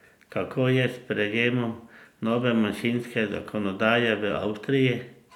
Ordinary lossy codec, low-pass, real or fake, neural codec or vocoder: none; 19.8 kHz; real; none